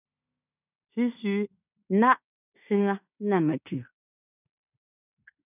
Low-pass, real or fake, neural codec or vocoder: 3.6 kHz; fake; codec, 16 kHz in and 24 kHz out, 0.9 kbps, LongCat-Audio-Codec, fine tuned four codebook decoder